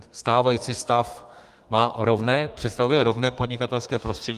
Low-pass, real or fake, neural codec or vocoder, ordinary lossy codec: 14.4 kHz; fake; codec, 32 kHz, 1.9 kbps, SNAC; Opus, 32 kbps